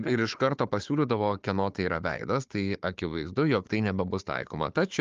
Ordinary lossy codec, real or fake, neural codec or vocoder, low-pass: Opus, 24 kbps; fake; codec, 16 kHz, 4 kbps, FunCodec, trained on Chinese and English, 50 frames a second; 7.2 kHz